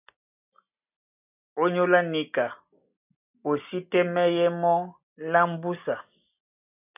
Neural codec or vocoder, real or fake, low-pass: none; real; 3.6 kHz